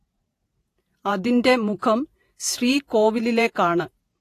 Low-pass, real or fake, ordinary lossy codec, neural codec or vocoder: 14.4 kHz; fake; AAC, 48 kbps; vocoder, 48 kHz, 128 mel bands, Vocos